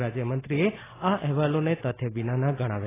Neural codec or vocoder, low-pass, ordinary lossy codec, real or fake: none; 3.6 kHz; AAC, 16 kbps; real